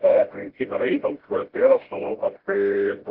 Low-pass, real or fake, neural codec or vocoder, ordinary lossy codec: 5.4 kHz; fake; codec, 16 kHz, 0.5 kbps, FreqCodec, smaller model; Opus, 16 kbps